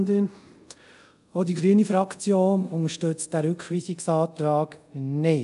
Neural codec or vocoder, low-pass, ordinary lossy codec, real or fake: codec, 24 kHz, 0.5 kbps, DualCodec; 10.8 kHz; none; fake